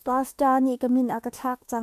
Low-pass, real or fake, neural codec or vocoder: 14.4 kHz; fake; autoencoder, 48 kHz, 32 numbers a frame, DAC-VAE, trained on Japanese speech